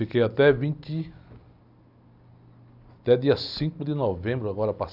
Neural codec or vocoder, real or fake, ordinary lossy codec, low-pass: none; real; none; 5.4 kHz